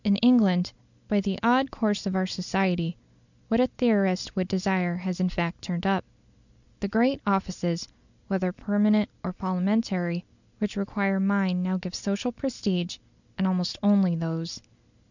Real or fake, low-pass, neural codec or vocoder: real; 7.2 kHz; none